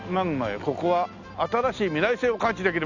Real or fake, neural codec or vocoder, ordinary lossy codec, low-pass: real; none; none; 7.2 kHz